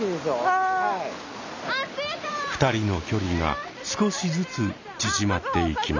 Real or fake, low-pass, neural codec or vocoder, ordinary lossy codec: real; 7.2 kHz; none; none